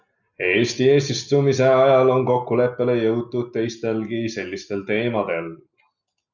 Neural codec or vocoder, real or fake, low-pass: none; real; 7.2 kHz